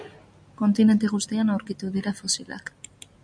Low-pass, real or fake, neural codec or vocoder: 9.9 kHz; real; none